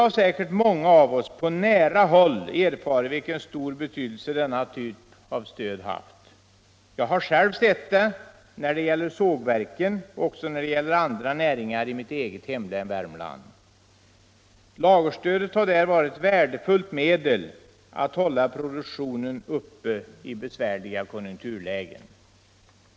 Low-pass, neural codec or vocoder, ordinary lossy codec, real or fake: none; none; none; real